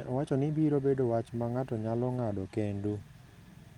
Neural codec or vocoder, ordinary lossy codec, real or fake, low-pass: none; Opus, 32 kbps; real; 19.8 kHz